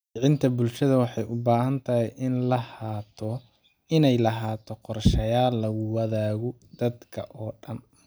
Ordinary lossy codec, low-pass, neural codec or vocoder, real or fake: none; none; none; real